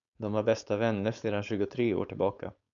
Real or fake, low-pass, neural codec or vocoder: fake; 7.2 kHz; codec, 16 kHz, 4.8 kbps, FACodec